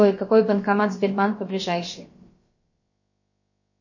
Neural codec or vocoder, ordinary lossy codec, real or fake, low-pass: codec, 16 kHz, about 1 kbps, DyCAST, with the encoder's durations; MP3, 32 kbps; fake; 7.2 kHz